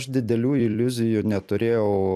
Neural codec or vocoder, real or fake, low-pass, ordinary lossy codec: vocoder, 44.1 kHz, 128 mel bands every 256 samples, BigVGAN v2; fake; 14.4 kHz; AAC, 96 kbps